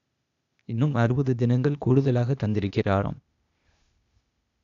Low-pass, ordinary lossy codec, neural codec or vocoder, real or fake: 7.2 kHz; none; codec, 16 kHz, 0.8 kbps, ZipCodec; fake